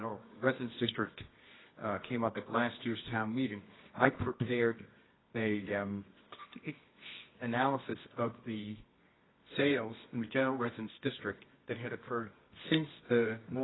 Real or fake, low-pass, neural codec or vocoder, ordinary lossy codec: fake; 7.2 kHz; codec, 24 kHz, 0.9 kbps, WavTokenizer, medium music audio release; AAC, 16 kbps